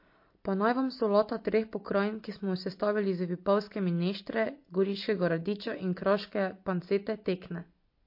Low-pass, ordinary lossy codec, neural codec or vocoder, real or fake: 5.4 kHz; MP3, 32 kbps; none; real